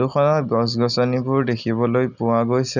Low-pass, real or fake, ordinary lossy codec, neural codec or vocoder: 7.2 kHz; real; none; none